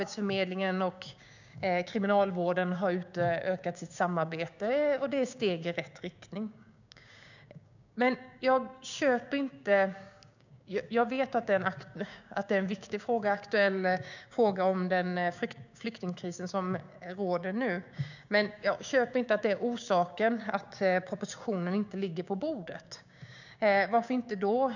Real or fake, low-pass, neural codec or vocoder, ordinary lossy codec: fake; 7.2 kHz; codec, 16 kHz, 6 kbps, DAC; none